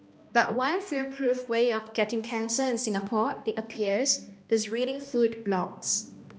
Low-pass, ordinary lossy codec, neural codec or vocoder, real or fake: none; none; codec, 16 kHz, 1 kbps, X-Codec, HuBERT features, trained on balanced general audio; fake